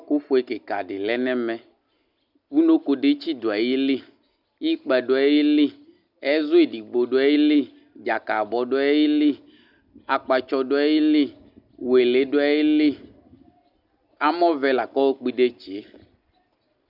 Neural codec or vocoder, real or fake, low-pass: none; real; 5.4 kHz